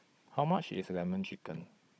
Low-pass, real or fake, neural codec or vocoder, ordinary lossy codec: none; fake; codec, 16 kHz, 16 kbps, FunCodec, trained on Chinese and English, 50 frames a second; none